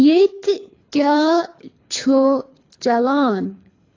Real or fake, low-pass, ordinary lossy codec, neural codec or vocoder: fake; 7.2 kHz; AAC, 32 kbps; codec, 24 kHz, 3 kbps, HILCodec